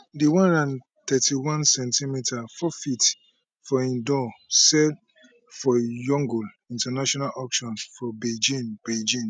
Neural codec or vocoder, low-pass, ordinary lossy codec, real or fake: none; 9.9 kHz; none; real